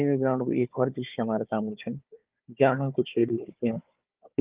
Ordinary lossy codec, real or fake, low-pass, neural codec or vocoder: Opus, 32 kbps; fake; 3.6 kHz; codec, 16 kHz, 4 kbps, FunCodec, trained on Chinese and English, 50 frames a second